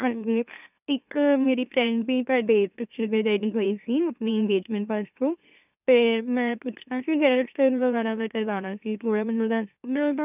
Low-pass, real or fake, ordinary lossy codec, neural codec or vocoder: 3.6 kHz; fake; none; autoencoder, 44.1 kHz, a latent of 192 numbers a frame, MeloTTS